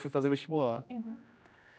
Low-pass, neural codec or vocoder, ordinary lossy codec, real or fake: none; codec, 16 kHz, 1 kbps, X-Codec, HuBERT features, trained on general audio; none; fake